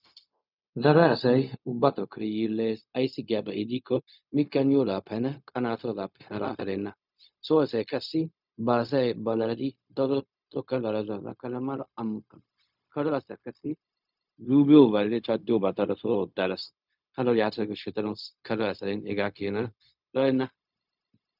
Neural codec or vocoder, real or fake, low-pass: codec, 16 kHz, 0.4 kbps, LongCat-Audio-Codec; fake; 5.4 kHz